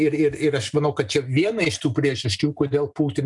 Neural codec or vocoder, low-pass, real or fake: none; 10.8 kHz; real